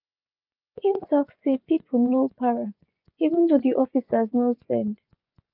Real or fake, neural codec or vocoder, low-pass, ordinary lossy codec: fake; vocoder, 24 kHz, 100 mel bands, Vocos; 5.4 kHz; none